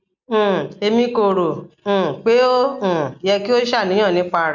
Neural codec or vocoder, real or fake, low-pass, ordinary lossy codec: none; real; 7.2 kHz; none